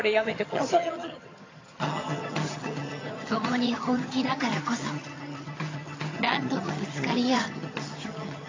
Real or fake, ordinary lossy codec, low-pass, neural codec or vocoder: fake; AAC, 32 kbps; 7.2 kHz; vocoder, 22.05 kHz, 80 mel bands, HiFi-GAN